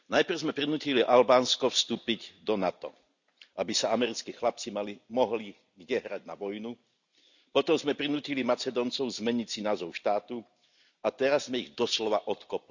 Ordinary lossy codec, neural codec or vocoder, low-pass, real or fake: none; none; 7.2 kHz; real